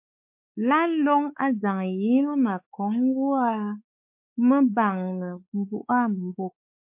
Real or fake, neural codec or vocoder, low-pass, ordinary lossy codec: fake; codec, 16 kHz, 4 kbps, X-Codec, WavLM features, trained on Multilingual LibriSpeech; 3.6 kHz; MP3, 32 kbps